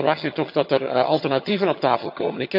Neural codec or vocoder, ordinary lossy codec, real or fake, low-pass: vocoder, 22.05 kHz, 80 mel bands, HiFi-GAN; MP3, 48 kbps; fake; 5.4 kHz